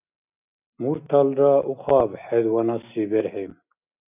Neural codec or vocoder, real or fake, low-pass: none; real; 3.6 kHz